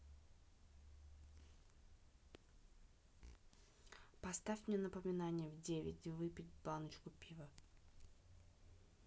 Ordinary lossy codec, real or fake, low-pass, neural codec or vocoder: none; real; none; none